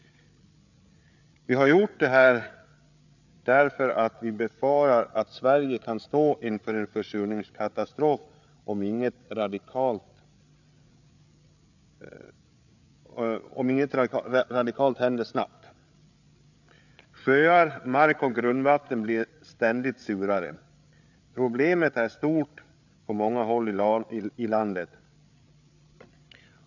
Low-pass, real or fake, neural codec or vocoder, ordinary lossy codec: 7.2 kHz; fake; codec, 16 kHz, 8 kbps, FreqCodec, larger model; none